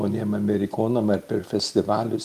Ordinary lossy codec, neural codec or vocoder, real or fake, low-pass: Opus, 32 kbps; none; real; 14.4 kHz